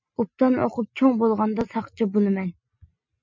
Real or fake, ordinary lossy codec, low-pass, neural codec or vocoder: real; MP3, 64 kbps; 7.2 kHz; none